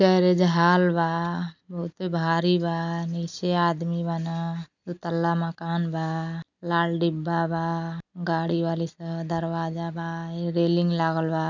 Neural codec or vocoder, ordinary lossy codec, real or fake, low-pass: none; none; real; 7.2 kHz